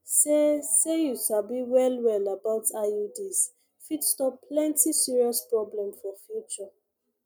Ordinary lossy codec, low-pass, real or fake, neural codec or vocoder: none; none; real; none